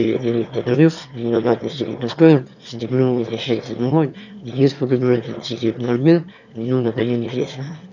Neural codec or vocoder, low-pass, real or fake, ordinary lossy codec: autoencoder, 22.05 kHz, a latent of 192 numbers a frame, VITS, trained on one speaker; 7.2 kHz; fake; none